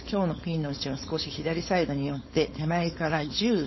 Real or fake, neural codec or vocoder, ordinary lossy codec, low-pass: fake; codec, 16 kHz, 4.8 kbps, FACodec; MP3, 24 kbps; 7.2 kHz